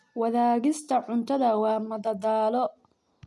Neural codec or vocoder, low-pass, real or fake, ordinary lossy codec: none; none; real; none